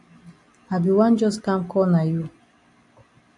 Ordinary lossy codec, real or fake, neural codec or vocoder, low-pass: AAC, 64 kbps; real; none; 10.8 kHz